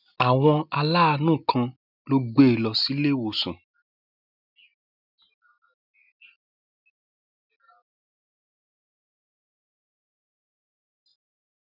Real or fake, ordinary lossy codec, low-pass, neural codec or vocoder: real; none; 5.4 kHz; none